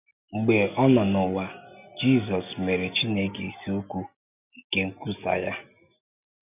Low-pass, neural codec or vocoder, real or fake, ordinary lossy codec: 3.6 kHz; none; real; none